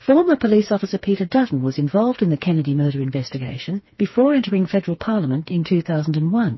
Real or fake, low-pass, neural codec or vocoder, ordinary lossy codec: fake; 7.2 kHz; codec, 16 kHz, 4 kbps, FreqCodec, smaller model; MP3, 24 kbps